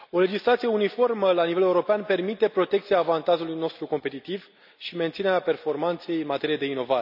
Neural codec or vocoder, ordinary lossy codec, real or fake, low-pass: none; none; real; 5.4 kHz